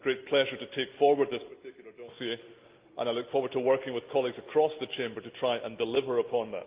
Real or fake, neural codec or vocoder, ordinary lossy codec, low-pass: real; none; Opus, 32 kbps; 3.6 kHz